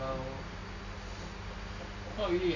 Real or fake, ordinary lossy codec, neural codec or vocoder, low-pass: real; none; none; 7.2 kHz